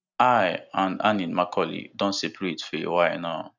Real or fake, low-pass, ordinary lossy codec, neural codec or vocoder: real; 7.2 kHz; none; none